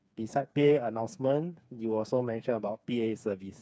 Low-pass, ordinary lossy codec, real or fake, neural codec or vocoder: none; none; fake; codec, 16 kHz, 4 kbps, FreqCodec, smaller model